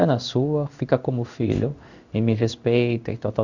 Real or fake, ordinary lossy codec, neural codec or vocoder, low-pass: fake; none; codec, 16 kHz in and 24 kHz out, 1 kbps, XY-Tokenizer; 7.2 kHz